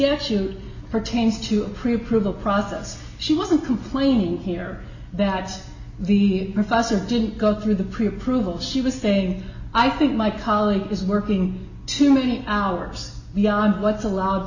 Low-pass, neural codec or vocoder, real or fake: 7.2 kHz; none; real